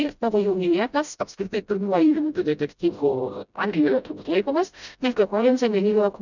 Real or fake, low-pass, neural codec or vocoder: fake; 7.2 kHz; codec, 16 kHz, 0.5 kbps, FreqCodec, smaller model